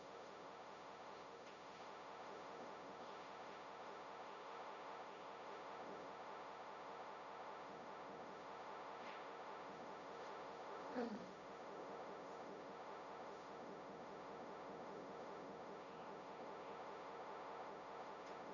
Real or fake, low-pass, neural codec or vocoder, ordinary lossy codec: fake; 7.2 kHz; codec, 16 kHz, 1.1 kbps, Voila-Tokenizer; none